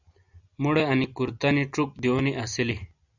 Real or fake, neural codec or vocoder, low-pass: real; none; 7.2 kHz